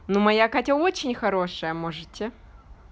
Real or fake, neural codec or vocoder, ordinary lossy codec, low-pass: real; none; none; none